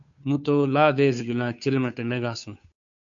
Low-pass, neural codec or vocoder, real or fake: 7.2 kHz; codec, 16 kHz, 2 kbps, FunCodec, trained on Chinese and English, 25 frames a second; fake